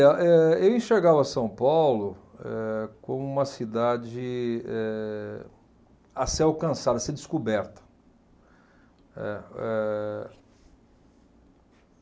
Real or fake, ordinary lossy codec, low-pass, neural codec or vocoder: real; none; none; none